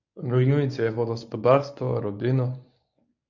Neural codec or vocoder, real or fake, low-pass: codec, 24 kHz, 0.9 kbps, WavTokenizer, medium speech release version 1; fake; 7.2 kHz